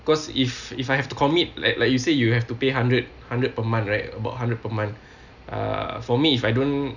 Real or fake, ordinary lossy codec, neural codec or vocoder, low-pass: real; none; none; 7.2 kHz